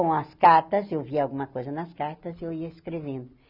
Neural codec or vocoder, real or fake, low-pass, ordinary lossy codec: none; real; 5.4 kHz; none